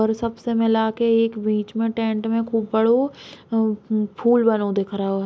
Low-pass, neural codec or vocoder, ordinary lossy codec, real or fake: none; none; none; real